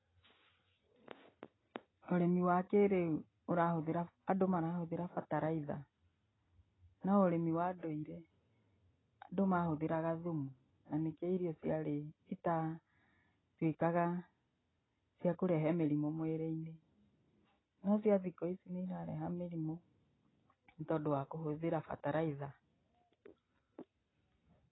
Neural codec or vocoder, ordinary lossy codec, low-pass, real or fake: none; AAC, 16 kbps; 7.2 kHz; real